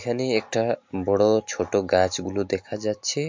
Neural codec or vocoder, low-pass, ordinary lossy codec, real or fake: none; 7.2 kHz; MP3, 48 kbps; real